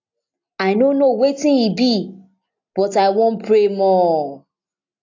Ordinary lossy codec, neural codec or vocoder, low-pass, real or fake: AAC, 48 kbps; none; 7.2 kHz; real